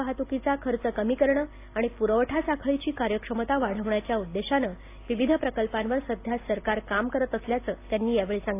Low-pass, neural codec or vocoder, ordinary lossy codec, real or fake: 3.6 kHz; none; AAC, 24 kbps; real